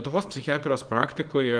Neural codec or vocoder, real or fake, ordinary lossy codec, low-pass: codec, 24 kHz, 0.9 kbps, WavTokenizer, small release; fake; Opus, 24 kbps; 9.9 kHz